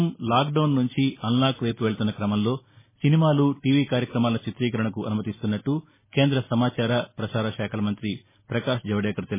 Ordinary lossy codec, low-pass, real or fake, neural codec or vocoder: MP3, 16 kbps; 3.6 kHz; real; none